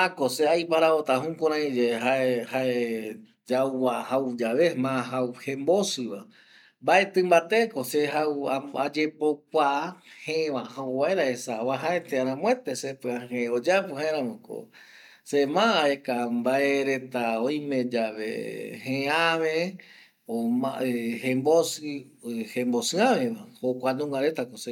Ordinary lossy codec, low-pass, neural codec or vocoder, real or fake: none; 19.8 kHz; none; real